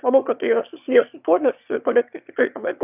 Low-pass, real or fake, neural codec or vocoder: 3.6 kHz; fake; autoencoder, 22.05 kHz, a latent of 192 numbers a frame, VITS, trained on one speaker